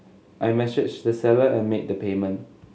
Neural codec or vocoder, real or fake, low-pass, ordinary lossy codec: none; real; none; none